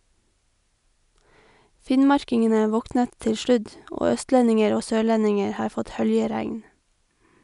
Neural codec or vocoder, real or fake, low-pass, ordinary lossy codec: none; real; 10.8 kHz; none